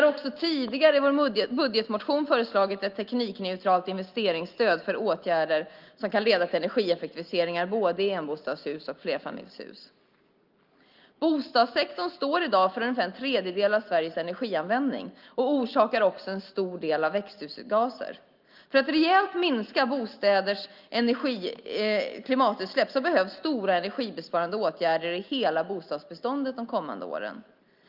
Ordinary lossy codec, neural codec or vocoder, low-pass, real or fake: Opus, 24 kbps; none; 5.4 kHz; real